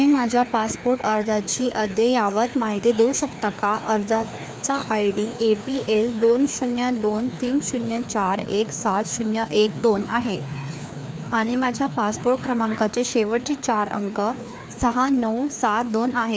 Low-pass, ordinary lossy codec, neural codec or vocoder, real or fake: none; none; codec, 16 kHz, 2 kbps, FreqCodec, larger model; fake